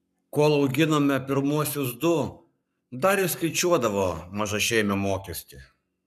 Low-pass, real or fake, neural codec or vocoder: 14.4 kHz; fake; codec, 44.1 kHz, 7.8 kbps, Pupu-Codec